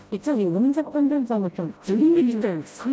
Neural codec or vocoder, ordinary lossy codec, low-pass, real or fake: codec, 16 kHz, 0.5 kbps, FreqCodec, smaller model; none; none; fake